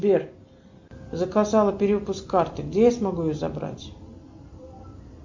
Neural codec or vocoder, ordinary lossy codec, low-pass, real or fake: none; MP3, 48 kbps; 7.2 kHz; real